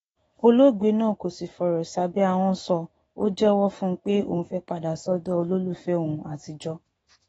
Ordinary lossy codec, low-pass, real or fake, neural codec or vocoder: AAC, 24 kbps; 19.8 kHz; fake; autoencoder, 48 kHz, 128 numbers a frame, DAC-VAE, trained on Japanese speech